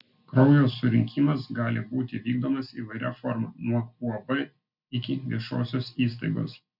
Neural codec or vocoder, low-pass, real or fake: none; 5.4 kHz; real